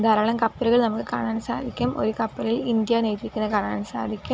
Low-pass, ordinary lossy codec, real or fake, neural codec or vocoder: none; none; real; none